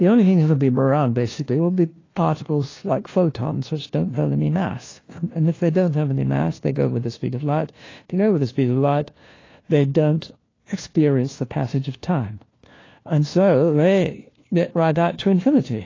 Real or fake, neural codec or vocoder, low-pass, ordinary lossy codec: fake; codec, 16 kHz, 1 kbps, FunCodec, trained on LibriTTS, 50 frames a second; 7.2 kHz; AAC, 32 kbps